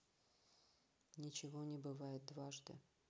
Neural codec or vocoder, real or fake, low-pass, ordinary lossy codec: none; real; none; none